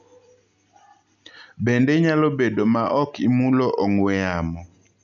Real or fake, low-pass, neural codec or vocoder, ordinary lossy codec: real; 7.2 kHz; none; none